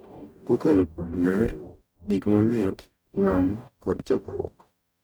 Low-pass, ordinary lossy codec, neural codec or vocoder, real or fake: none; none; codec, 44.1 kHz, 0.9 kbps, DAC; fake